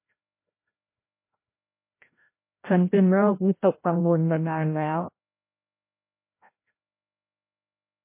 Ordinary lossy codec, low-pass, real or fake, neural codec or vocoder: MP3, 24 kbps; 3.6 kHz; fake; codec, 16 kHz, 0.5 kbps, FreqCodec, larger model